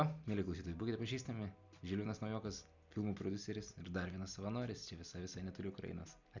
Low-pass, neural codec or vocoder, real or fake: 7.2 kHz; none; real